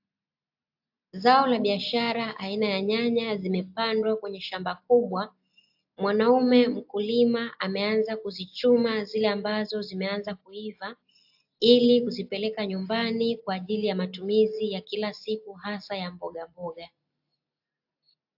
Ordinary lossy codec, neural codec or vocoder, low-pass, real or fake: AAC, 48 kbps; none; 5.4 kHz; real